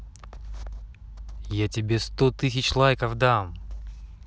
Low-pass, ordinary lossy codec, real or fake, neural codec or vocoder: none; none; real; none